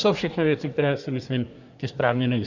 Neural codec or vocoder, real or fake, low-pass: codec, 24 kHz, 1 kbps, SNAC; fake; 7.2 kHz